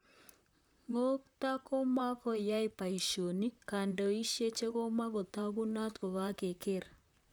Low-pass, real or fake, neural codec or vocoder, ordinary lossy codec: none; fake; vocoder, 44.1 kHz, 128 mel bands, Pupu-Vocoder; none